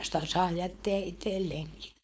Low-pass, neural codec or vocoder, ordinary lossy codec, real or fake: none; codec, 16 kHz, 4.8 kbps, FACodec; none; fake